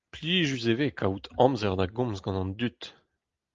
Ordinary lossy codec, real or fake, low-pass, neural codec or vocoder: Opus, 32 kbps; real; 7.2 kHz; none